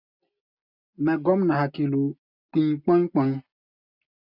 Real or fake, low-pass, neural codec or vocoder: real; 5.4 kHz; none